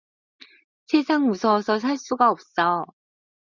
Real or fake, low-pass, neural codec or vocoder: real; 7.2 kHz; none